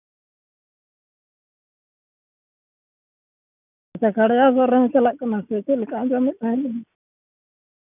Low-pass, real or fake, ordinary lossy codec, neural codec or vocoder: 3.6 kHz; real; none; none